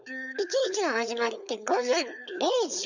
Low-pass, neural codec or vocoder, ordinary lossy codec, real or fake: 7.2 kHz; codec, 16 kHz, 4.8 kbps, FACodec; none; fake